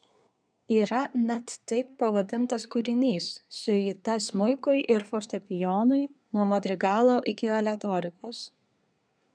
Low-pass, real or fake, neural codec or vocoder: 9.9 kHz; fake; codec, 24 kHz, 1 kbps, SNAC